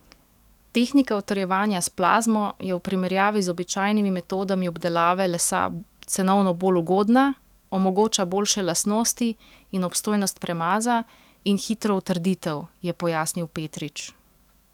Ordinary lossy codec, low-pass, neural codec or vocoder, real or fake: none; 19.8 kHz; codec, 44.1 kHz, 7.8 kbps, DAC; fake